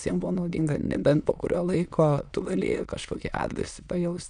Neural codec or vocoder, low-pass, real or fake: autoencoder, 22.05 kHz, a latent of 192 numbers a frame, VITS, trained on many speakers; 9.9 kHz; fake